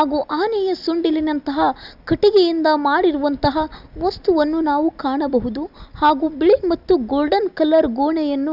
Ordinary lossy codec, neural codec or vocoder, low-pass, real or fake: none; none; 5.4 kHz; real